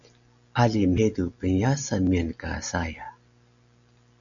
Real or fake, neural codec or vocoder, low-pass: real; none; 7.2 kHz